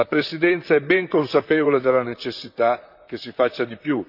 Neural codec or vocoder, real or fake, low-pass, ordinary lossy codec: vocoder, 44.1 kHz, 128 mel bands, Pupu-Vocoder; fake; 5.4 kHz; none